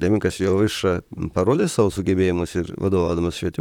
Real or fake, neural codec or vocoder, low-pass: fake; vocoder, 44.1 kHz, 128 mel bands, Pupu-Vocoder; 19.8 kHz